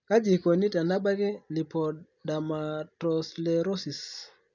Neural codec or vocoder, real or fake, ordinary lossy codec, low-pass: none; real; none; 7.2 kHz